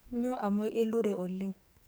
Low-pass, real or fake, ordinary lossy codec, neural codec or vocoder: none; fake; none; codec, 44.1 kHz, 2.6 kbps, SNAC